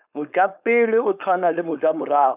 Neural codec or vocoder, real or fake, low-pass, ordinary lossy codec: codec, 16 kHz, 4.8 kbps, FACodec; fake; 3.6 kHz; none